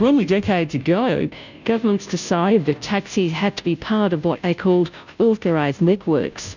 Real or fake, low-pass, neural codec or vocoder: fake; 7.2 kHz; codec, 16 kHz, 0.5 kbps, FunCodec, trained on Chinese and English, 25 frames a second